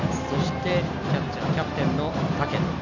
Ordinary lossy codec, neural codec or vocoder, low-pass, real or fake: Opus, 64 kbps; none; 7.2 kHz; real